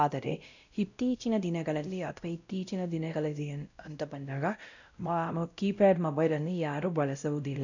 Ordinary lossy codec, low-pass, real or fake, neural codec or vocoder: none; 7.2 kHz; fake; codec, 16 kHz, 0.5 kbps, X-Codec, WavLM features, trained on Multilingual LibriSpeech